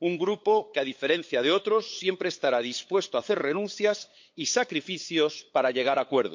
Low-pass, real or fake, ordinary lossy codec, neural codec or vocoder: 7.2 kHz; fake; MP3, 48 kbps; codec, 16 kHz, 8 kbps, FunCodec, trained on LibriTTS, 25 frames a second